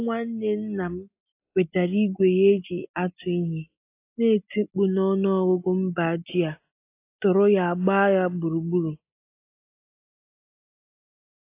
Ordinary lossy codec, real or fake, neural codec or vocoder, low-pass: AAC, 24 kbps; real; none; 3.6 kHz